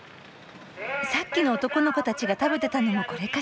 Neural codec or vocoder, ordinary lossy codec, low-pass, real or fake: none; none; none; real